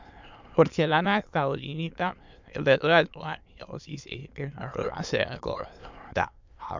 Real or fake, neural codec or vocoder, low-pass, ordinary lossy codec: fake; autoencoder, 22.05 kHz, a latent of 192 numbers a frame, VITS, trained on many speakers; 7.2 kHz; MP3, 64 kbps